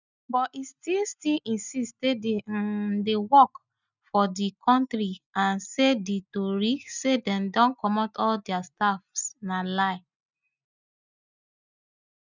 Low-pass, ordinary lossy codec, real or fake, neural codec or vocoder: 7.2 kHz; none; real; none